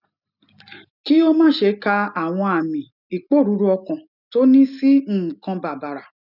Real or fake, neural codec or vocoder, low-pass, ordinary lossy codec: real; none; 5.4 kHz; none